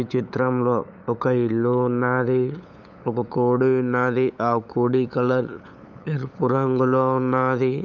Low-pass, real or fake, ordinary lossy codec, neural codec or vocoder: none; fake; none; codec, 16 kHz, 8 kbps, FunCodec, trained on LibriTTS, 25 frames a second